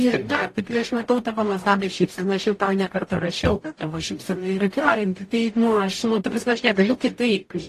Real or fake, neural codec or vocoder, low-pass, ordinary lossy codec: fake; codec, 44.1 kHz, 0.9 kbps, DAC; 14.4 kHz; AAC, 48 kbps